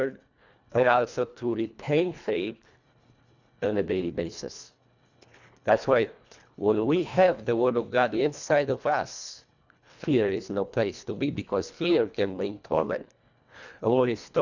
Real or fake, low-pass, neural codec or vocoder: fake; 7.2 kHz; codec, 24 kHz, 1.5 kbps, HILCodec